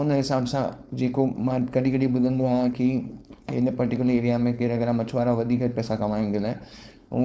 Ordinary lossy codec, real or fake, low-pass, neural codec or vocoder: none; fake; none; codec, 16 kHz, 4.8 kbps, FACodec